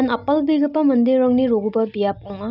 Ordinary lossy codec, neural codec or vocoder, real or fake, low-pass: none; none; real; 5.4 kHz